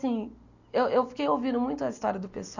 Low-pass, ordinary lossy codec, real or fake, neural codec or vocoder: 7.2 kHz; none; real; none